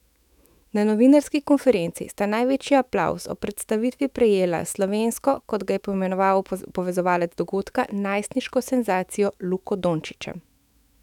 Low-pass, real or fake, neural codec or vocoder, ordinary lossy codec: 19.8 kHz; fake; autoencoder, 48 kHz, 128 numbers a frame, DAC-VAE, trained on Japanese speech; none